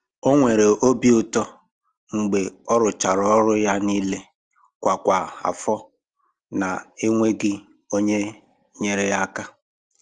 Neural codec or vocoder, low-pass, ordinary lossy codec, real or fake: none; 9.9 kHz; Opus, 24 kbps; real